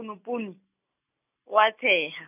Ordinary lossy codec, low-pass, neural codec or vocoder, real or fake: none; 3.6 kHz; none; real